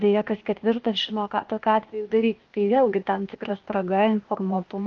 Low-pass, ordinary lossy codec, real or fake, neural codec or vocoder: 7.2 kHz; Opus, 24 kbps; fake; codec, 16 kHz, 0.8 kbps, ZipCodec